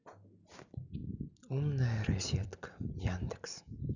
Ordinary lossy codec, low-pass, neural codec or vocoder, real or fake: none; 7.2 kHz; none; real